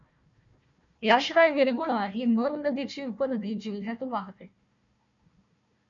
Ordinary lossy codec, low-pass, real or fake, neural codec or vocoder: MP3, 96 kbps; 7.2 kHz; fake; codec, 16 kHz, 1 kbps, FunCodec, trained on Chinese and English, 50 frames a second